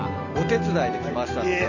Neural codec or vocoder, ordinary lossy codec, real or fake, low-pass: none; none; real; 7.2 kHz